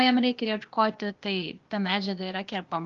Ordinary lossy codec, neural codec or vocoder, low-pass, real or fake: Opus, 32 kbps; codec, 16 kHz, 0.8 kbps, ZipCodec; 7.2 kHz; fake